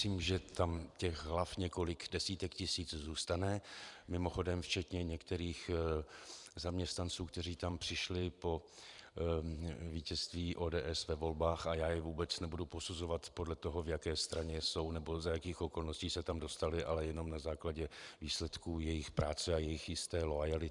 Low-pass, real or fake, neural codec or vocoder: 10.8 kHz; fake; vocoder, 44.1 kHz, 128 mel bands every 512 samples, BigVGAN v2